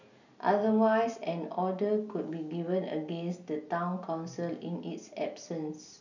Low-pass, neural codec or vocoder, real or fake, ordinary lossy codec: 7.2 kHz; none; real; none